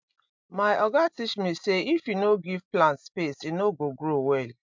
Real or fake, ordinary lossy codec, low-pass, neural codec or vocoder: real; MP3, 64 kbps; 7.2 kHz; none